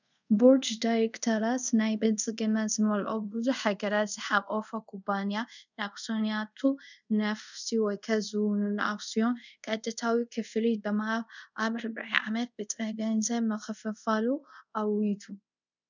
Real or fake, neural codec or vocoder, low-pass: fake; codec, 24 kHz, 0.5 kbps, DualCodec; 7.2 kHz